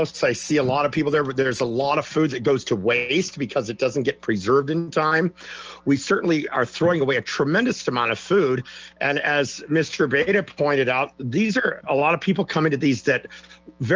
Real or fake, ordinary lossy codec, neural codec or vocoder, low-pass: real; Opus, 24 kbps; none; 7.2 kHz